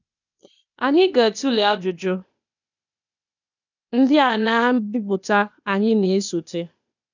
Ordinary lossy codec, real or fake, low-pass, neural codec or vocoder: none; fake; 7.2 kHz; codec, 16 kHz, 0.8 kbps, ZipCodec